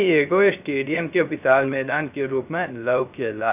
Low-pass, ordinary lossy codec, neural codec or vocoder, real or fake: 3.6 kHz; none; codec, 16 kHz, 0.3 kbps, FocalCodec; fake